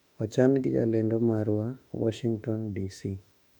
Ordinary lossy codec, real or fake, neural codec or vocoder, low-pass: none; fake; autoencoder, 48 kHz, 32 numbers a frame, DAC-VAE, trained on Japanese speech; 19.8 kHz